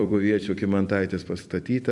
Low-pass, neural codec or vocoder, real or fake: 10.8 kHz; vocoder, 44.1 kHz, 128 mel bands every 256 samples, BigVGAN v2; fake